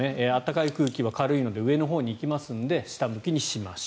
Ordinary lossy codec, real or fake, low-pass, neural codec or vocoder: none; real; none; none